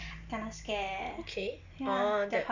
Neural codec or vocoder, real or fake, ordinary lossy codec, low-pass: none; real; none; 7.2 kHz